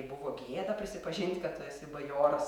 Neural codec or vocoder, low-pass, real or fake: vocoder, 48 kHz, 128 mel bands, Vocos; 19.8 kHz; fake